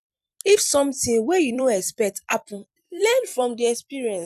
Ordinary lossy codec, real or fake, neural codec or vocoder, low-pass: none; fake; vocoder, 44.1 kHz, 128 mel bands every 256 samples, BigVGAN v2; 14.4 kHz